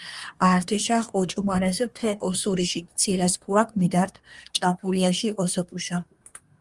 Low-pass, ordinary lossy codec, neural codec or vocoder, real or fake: 10.8 kHz; Opus, 32 kbps; codec, 24 kHz, 1 kbps, SNAC; fake